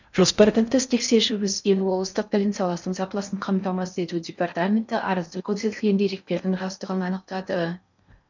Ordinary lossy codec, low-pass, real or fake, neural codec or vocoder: none; 7.2 kHz; fake; codec, 16 kHz in and 24 kHz out, 0.6 kbps, FocalCodec, streaming, 4096 codes